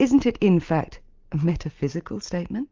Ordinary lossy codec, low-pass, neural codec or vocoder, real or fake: Opus, 24 kbps; 7.2 kHz; none; real